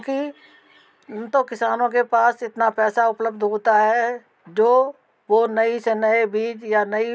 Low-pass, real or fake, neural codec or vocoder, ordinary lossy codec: none; real; none; none